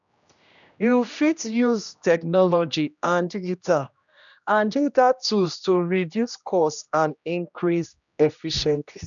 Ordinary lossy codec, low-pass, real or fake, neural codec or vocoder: none; 7.2 kHz; fake; codec, 16 kHz, 1 kbps, X-Codec, HuBERT features, trained on general audio